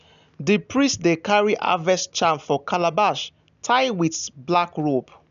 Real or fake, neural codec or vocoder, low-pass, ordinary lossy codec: real; none; 7.2 kHz; none